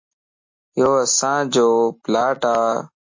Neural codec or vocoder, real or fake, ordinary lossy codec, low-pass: none; real; MP3, 32 kbps; 7.2 kHz